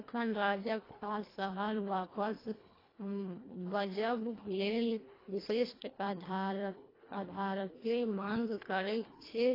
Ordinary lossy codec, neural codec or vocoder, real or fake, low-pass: AAC, 24 kbps; codec, 24 kHz, 1.5 kbps, HILCodec; fake; 5.4 kHz